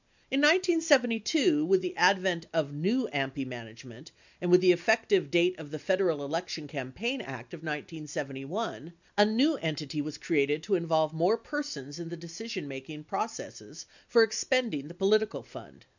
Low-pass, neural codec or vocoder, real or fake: 7.2 kHz; none; real